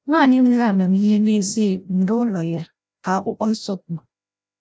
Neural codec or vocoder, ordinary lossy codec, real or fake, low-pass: codec, 16 kHz, 0.5 kbps, FreqCodec, larger model; none; fake; none